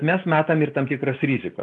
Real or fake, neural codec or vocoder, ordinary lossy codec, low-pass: real; none; AAC, 64 kbps; 10.8 kHz